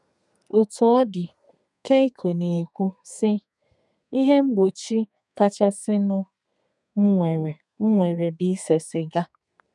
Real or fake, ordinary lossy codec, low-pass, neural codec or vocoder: fake; none; 10.8 kHz; codec, 32 kHz, 1.9 kbps, SNAC